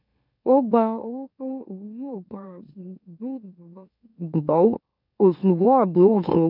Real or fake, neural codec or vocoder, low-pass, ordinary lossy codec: fake; autoencoder, 44.1 kHz, a latent of 192 numbers a frame, MeloTTS; 5.4 kHz; none